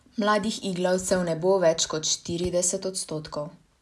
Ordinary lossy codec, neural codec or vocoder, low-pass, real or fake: none; none; none; real